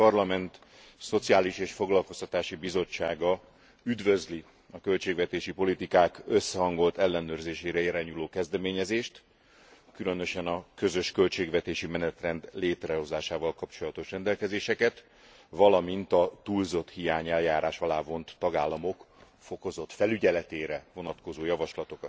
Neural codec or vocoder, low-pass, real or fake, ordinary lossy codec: none; none; real; none